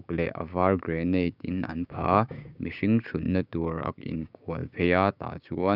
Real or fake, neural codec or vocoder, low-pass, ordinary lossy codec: fake; codec, 16 kHz, 6 kbps, DAC; 5.4 kHz; none